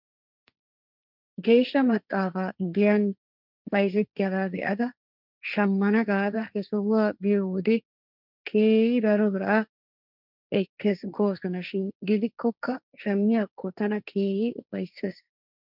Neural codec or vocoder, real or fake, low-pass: codec, 16 kHz, 1.1 kbps, Voila-Tokenizer; fake; 5.4 kHz